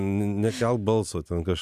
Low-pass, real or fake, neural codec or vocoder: 14.4 kHz; real; none